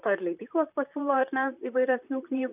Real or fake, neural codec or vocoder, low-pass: fake; vocoder, 44.1 kHz, 128 mel bands, Pupu-Vocoder; 3.6 kHz